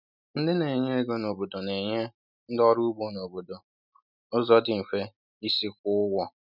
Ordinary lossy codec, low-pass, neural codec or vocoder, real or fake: none; 5.4 kHz; none; real